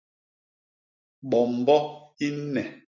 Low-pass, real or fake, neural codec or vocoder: 7.2 kHz; real; none